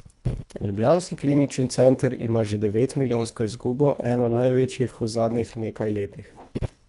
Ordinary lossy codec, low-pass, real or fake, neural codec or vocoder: none; 10.8 kHz; fake; codec, 24 kHz, 1.5 kbps, HILCodec